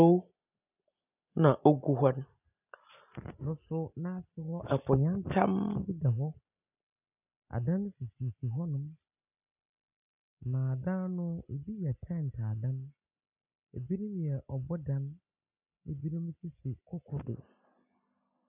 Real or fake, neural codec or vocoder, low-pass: real; none; 3.6 kHz